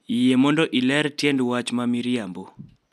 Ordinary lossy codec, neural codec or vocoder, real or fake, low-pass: none; none; real; 14.4 kHz